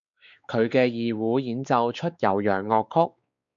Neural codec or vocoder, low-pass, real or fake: codec, 16 kHz, 4 kbps, X-Codec, WavLM features, trained on Multilingual LibriSpeech; 7.2 kHz; fake